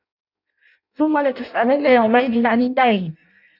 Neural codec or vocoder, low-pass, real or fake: codec, 16 kHz in and 24 kHz out, 0.6 kbps, FireRedTTS-2 codec; 5.4 kHz; fake